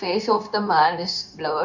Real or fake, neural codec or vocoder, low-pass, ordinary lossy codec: fake; codec, 16 kHz, 0.9 kbps, LongCat-Audio-Codec; 7.2 kHz; none